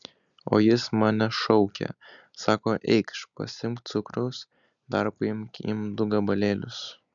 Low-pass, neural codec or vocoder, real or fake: 7.2 kHz; none; real